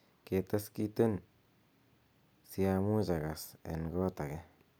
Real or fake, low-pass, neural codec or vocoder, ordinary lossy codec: real; none; none; none